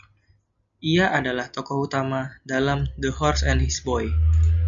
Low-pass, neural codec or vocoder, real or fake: 7.2 kHz; none; real